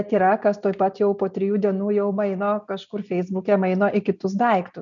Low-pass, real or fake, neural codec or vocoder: 7.2 kHz; real; none